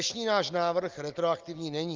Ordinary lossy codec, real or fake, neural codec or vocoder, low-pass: Opus, 32 kbps; real; none; 7.2 kHz